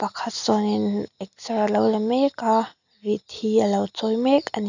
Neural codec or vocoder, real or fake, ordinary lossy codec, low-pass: none; real; none; 7.2 kHz